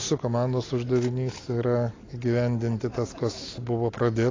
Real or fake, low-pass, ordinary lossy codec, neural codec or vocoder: real; 7.2 kHz; AAC, 32 kbps; none